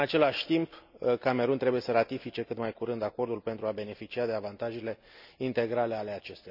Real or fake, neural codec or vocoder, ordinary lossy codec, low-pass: real; none; none; 5.4 kHz